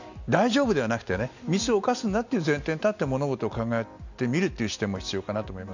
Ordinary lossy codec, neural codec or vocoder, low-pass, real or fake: none; none; 7.2 kHz; real